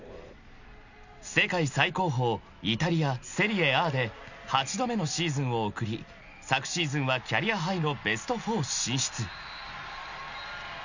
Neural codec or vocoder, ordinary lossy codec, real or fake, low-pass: none; none; real; 7.2 kHz